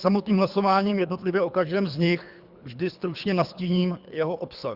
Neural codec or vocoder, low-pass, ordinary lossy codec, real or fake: codec, 24 kHz, 3 kbps, HILCodec; 5.4 kHz; Opus, 64 kbps; fake